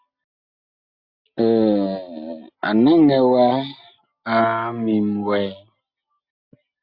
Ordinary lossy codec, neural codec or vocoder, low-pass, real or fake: Opus, 64 kbps; none; 5.4 kHz; real